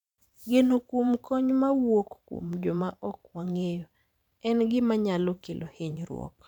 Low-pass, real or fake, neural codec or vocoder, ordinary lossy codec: 19.8 kHz; fake; vocoder, 44.1 kHz, 128 mel bands every 512 samples, BigVGAN v2; none